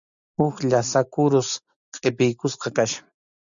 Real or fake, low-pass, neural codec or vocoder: real; 7.2 kHz; none